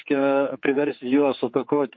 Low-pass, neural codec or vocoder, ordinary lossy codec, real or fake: 7.2 kHz; codec, 16 kHz, 4 kbps, X-Codec, HuBERT features, trained on general audio; MP3, 32 kbps; fake